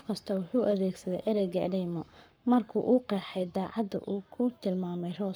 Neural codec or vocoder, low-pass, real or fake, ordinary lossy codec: codec, 44.1 kHz, 7.8 kbps, Pupu-Codec; none; fake; none